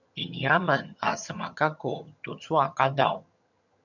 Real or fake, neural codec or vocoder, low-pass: fake; vocoder, 22.05 kHz, 80 mel bands, HiFi-GAN; 7.2 kHz